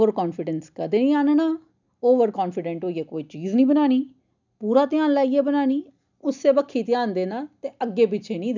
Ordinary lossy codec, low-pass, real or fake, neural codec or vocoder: none; 7.2 kHz; real; none